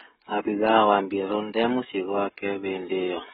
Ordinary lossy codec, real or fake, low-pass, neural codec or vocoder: AAC, 16 kbps; fake; 7.2 kHz; codec, 16 kHz, 16 kbps, FreqCodec, smaller model